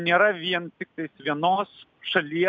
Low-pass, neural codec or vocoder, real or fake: 7.2 kHz; none; real